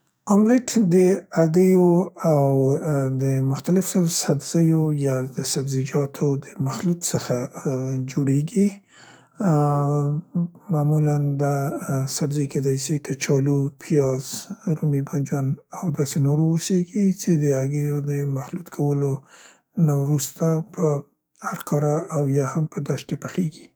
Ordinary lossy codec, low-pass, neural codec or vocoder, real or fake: none; none; codec, 44.1 kHz, 2.6 kbps, SNAC; fake